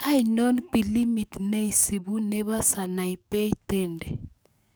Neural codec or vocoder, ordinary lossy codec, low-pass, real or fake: codec, 44.1 kHz, 7.8 kbps, DAC; none; none; fake